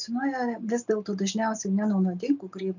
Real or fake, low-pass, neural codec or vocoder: real; 7.2 kHz; none